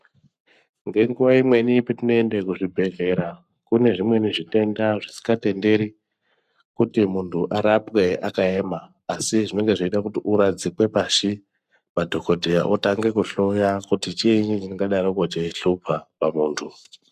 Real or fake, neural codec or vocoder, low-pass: fake; codec, 44.1 kHz, 7.8 kbps, Pupu-Codec; 14.4 kHz